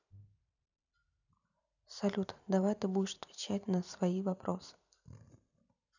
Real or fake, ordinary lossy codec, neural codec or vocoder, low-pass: fake; none; vocoder, 44.1 kHz, 128 mel bands every 512 samples, BigVGAN v2; 7.2 kHz